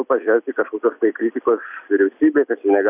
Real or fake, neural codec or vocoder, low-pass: real; none; 3.6 kHz